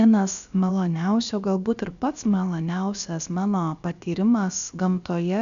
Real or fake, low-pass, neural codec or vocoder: fake; 7.2 kHz; codec, 16 kHz, about 1 kbps, DyCAST, with the encoder's durations